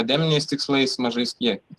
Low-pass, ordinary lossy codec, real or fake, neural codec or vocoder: 14.4 kHz; Opus, 24 kbps; real; none